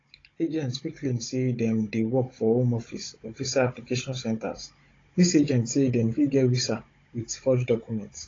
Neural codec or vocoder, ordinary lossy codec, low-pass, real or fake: codec, 16 kHz, 16 kbps, FunCodec, trained on Chinese and English, 50 frames a second; AAC, 32 kbps; 7.2 kHz; fake